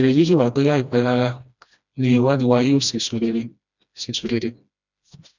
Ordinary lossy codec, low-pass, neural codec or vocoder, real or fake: none; 7.2 kHz; codec, 16 kHz, 1 kbps, FreqCodec, smaller model; fake